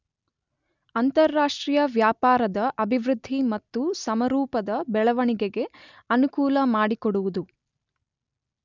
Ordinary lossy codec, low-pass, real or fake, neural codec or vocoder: none; 7.2 kHz; real; none